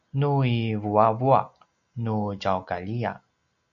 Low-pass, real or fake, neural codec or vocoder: 7.2 kHz; real; none